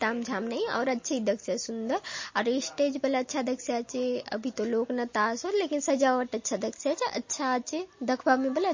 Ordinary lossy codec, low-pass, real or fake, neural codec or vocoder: MP3, 32 kbps; 7.2 kHz; real; none